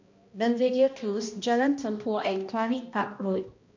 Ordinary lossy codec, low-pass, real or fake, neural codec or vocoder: MP3, 48 kbps; 7.2 kHz; fake; codec, 16 kHz, 1 kbps, X-Codec, HuBERT features, trained on balanced general audio